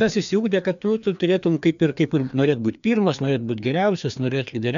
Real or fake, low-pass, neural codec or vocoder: fake; 7.2 kHz; codec, 16 kHz, 2 kbps, FreqCodec, larger model